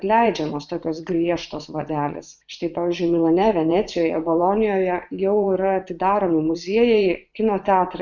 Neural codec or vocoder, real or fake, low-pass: vocoder, 24 kHz, 100 mel bands, Vocos; fake; 7.2 kHz